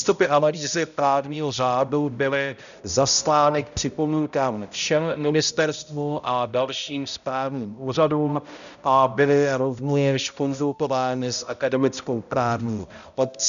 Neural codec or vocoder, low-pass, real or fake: codec, 16 kHz, 0.5 kbps, X-Codec, HuBERT features, trained on balanced general audio; 7.2 kHz; fake